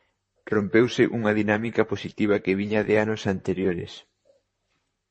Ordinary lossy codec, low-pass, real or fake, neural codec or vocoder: MP3, 32 kbps; 10.8 kHz; fake; vocoder, 44.1 kHz, 128 mel bands, Pupu-Vocoder